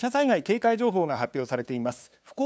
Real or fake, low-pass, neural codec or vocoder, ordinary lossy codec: fake; none; codec, 16 kHz, 2 kbps, FunCodec, trained on LibriTTS, 25 frames a second; none